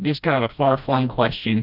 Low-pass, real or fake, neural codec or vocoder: 5.4 kHz; fake; codec, 16 kHz, 1 kbps, FreqCodec, smaller model